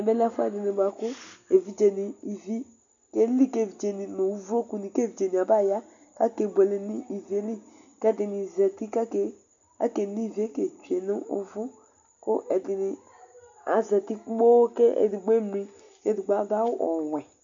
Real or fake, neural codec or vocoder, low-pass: real; none; 7.2 kHz